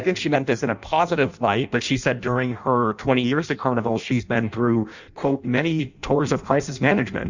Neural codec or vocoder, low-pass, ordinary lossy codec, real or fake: codec, 16 kHz in and 24 kHz out, 0.6 kbps, FireRedTTS-2 codec; 7.2 kHz; Opus, 64 kbps; fake